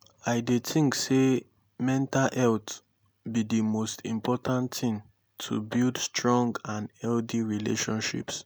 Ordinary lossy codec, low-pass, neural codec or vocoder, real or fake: none; none; none; real